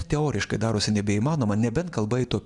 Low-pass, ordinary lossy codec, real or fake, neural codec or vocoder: 10.8 kHz; Opus, 64 kbps; real; none